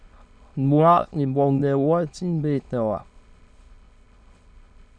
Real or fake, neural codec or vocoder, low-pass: fake; autoencoder, 22.05 kHz, a latent of 192 numbers a frame, VITS, trained on many speakers; 9.9 kHz